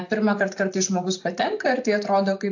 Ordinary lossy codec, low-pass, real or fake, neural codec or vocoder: AAC, 48 kbps; 7.2 kHz; real; none